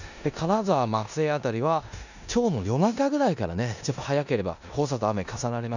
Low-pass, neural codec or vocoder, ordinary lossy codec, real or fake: 7.2 kHz; codec, 16 kHz in and 24 kHz out, 0.9 kbps, LongCat-Audio-Codec, four codebook decoder; none; fake